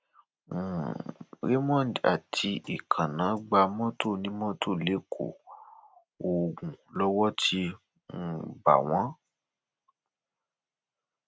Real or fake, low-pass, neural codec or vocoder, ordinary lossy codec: real; none; none; none